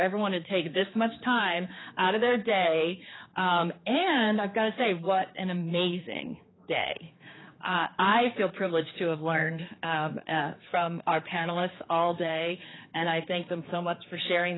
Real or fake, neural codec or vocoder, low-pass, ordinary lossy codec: fake; codec, 16 kHz, 4 kbps, X-Codec, HuBERT features, trained on general audio; 7.2 kHz; AAC, 16 kbps